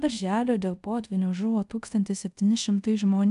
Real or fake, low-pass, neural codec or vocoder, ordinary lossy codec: fake; 10.8 kHz; codec, 24 kHz, 0.5 kbps, DualCodec; AAC, 96 kbps